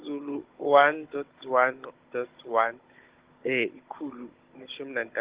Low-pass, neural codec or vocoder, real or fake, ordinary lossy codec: 3.6 kHz; codec, 16 kHz, 16 kbps, FunCodec, trained on Chinese and English, 50 frames a second; fake; Opus, 16 kbps